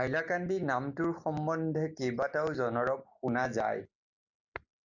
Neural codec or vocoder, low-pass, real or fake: none; 7.2 kHz; real